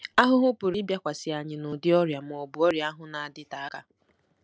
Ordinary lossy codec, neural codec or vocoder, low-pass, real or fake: none; none; none; real